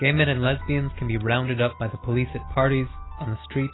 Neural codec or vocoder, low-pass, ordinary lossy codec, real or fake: none; 7.2 kHz; AAC, 16 kbps; real